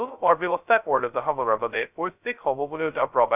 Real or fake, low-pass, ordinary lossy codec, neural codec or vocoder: fake; 3.6 kHz; none; codec, 16 kHz, 0.2 kbps, FocalCodec